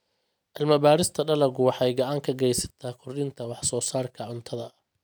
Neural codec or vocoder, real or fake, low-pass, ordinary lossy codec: none; real; none; none